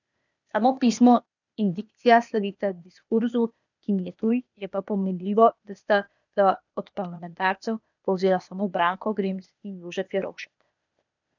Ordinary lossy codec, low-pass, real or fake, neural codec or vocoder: none; 7.2 kHz; fake; codec, 16 kHz, 0.8 kbps, ZipCodec